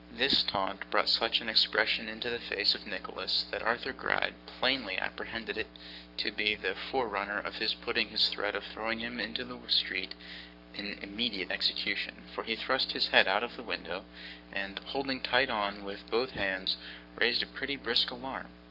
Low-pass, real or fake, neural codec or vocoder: 5.4 kHz; fake; codec, 44.1 kHz, 7.8 kbps, Pupu-Codec